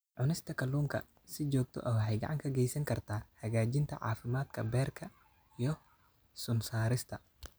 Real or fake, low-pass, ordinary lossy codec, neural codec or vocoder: real; none; none; none